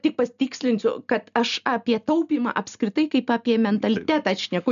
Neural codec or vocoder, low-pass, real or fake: none; 7.2 kHz; real